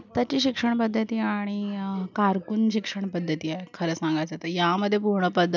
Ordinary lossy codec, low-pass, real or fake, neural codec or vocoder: none; 7.2 kHz; real; none